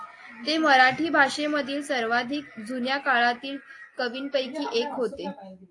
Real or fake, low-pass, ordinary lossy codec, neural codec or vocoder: real; 10.8 kHz; AAC, 48 kbps; none